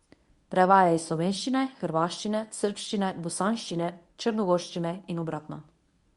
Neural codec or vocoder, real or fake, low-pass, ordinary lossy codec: codec, 24 kHz, 0.9 kbps, WavTokenizer, medium speech release version 1; fake; 10.8 kHz; Opus, 64 kbps